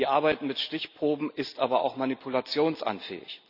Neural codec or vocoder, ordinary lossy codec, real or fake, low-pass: none; none; real; 5.4 kHz